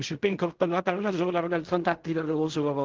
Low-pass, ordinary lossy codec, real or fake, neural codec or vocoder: 7.2 kHz; Opus, 16 kbps; fake; codec, 16 kHz in and 24 kHz out, 0.4 kbps, LongCat-Audio-Codec, fine tuned four codebook decoder